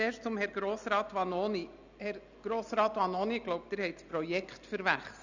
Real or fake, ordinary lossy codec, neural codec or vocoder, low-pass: real; Opus, 64 kbps; none; 7.2 kHz